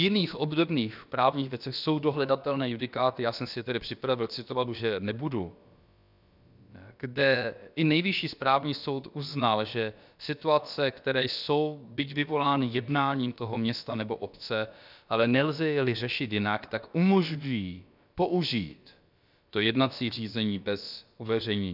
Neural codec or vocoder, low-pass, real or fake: codec, 16 kHz, about 1 kbps, DyCAST, with the encoder's durations; 5.4 kHz; fake